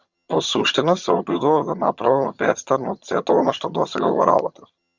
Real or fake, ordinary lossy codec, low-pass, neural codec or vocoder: fake; Opus, 64 kbps; 7.2 kHz; vocoder, 22.05 kHz, 80 mel bands, HiFi-GAN